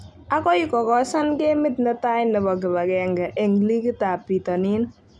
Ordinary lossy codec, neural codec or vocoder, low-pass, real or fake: none; none; none; real